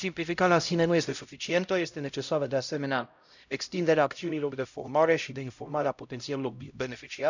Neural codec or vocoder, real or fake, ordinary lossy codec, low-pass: codec, 16 kHz, 0.5 kbps, X-Codec, HuBERT features, trained on LibriSpeech; fake; none; 7.2 kHz